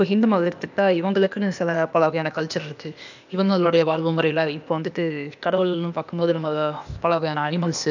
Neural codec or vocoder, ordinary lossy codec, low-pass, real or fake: codec, 16 kHz, 0.8 kbps, ZipCodec; none; 7.2 kHz; fake